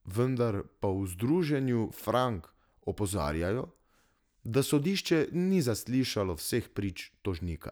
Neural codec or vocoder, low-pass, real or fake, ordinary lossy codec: none; none; real; none